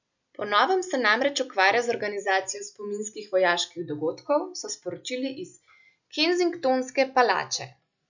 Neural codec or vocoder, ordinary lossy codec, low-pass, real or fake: none; none; 7.2 kHz; real